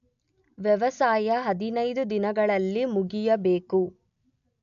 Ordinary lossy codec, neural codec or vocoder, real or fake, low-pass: none; none; real; 7.2 kHz